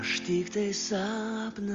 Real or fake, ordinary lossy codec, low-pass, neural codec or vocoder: real; Opus, 32 kbps; 7.2 kHz; none